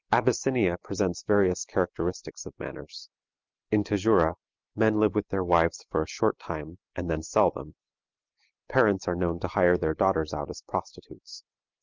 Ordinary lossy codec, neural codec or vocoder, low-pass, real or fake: Opus, 24 kbps; none; 7.2 kHz; real